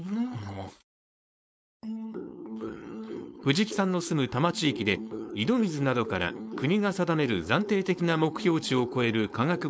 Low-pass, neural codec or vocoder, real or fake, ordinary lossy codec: none; codec, 16 kHz, 4.8 kbps, FACodec; fake; none